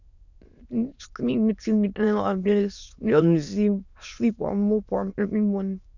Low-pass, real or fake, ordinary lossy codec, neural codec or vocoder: 7.2 kHz; fake; none; autoencoder, 22.05 kHz, a latent of 192 numbers a frame, VITS, trained on many speakers